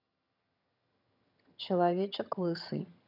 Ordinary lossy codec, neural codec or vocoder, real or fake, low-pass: none; vocoder, 22.05 kHz, 80 mel bands, HiFi-GAN; fake; 5.4 kHz